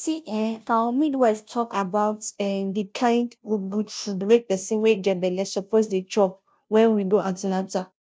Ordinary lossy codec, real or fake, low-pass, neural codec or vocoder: none; fake; none; codec, 16 kHz, 0.5 kbps, FunCodec, trained on Chinese and English, 25 frames a second